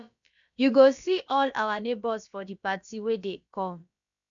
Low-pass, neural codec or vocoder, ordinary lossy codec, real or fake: 7.2 kHz; codec, 16 kHz, about 1 kbps, DyCAST, with the encoder's durations; none; fake